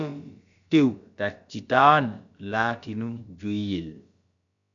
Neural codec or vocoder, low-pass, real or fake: codec, 16 kHz, about 1 kbps, DyCAST, with the encoder's durations; 7.2 kHz; fake